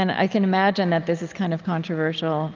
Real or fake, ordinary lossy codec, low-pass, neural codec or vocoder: real; Opus, 32 kbps; 7.2 kHz; none